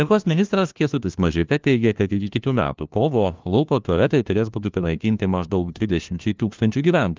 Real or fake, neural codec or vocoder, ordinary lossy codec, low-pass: fake; codec, 16 kHz, 1 kbps, FunCodec, trained on LibriTTS, 50 frames a second; Opus, 32 kbps; 7.2 kHz